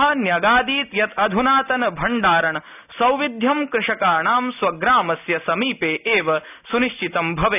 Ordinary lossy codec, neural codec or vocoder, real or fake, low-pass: none; none; real; 3.6 kHz